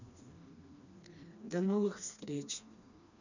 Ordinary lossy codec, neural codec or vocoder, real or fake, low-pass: none; codec, 16 kHz, 2 kbps, FreqCodec, smaller model; fake; 7.2 kHz